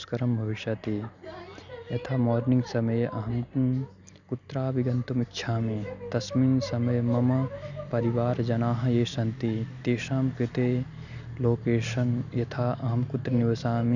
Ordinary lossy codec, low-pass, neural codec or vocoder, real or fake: none; 7.2 kHz; none; real